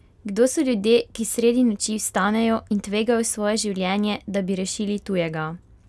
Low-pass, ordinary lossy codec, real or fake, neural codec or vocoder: none; none; real; none